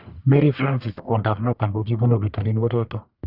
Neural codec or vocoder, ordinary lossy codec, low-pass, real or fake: codec, 44.1 kHz, 1.7 kbps, Pupu-Codec; none; 5.4 kHz; fake